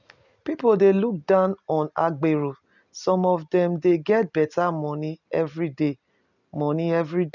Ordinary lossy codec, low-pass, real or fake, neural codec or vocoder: none; 7.2 kHz; real; none